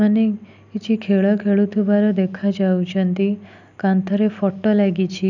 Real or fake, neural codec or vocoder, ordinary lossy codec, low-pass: real; none; none; 7.2 kHz